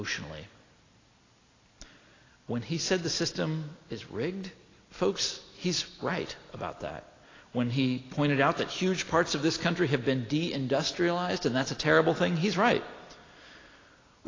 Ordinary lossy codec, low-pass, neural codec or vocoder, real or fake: AAC, 32 kbps; 7.2 kHz; none; real